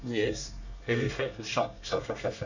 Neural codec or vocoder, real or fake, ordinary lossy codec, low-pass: codec, 24 kHz, 1 kbps, SNAC; fake; none; 7.2 kHz